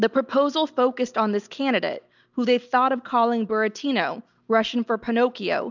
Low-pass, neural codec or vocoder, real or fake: 7.2 kHz; none; real